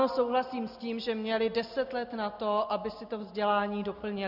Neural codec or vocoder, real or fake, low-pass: none; real; 5.4 kHz